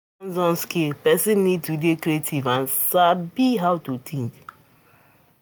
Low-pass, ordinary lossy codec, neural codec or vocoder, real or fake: none; none; none; real